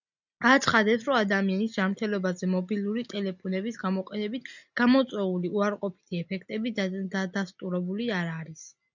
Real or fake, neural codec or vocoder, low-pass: real; none; 7.2 kHz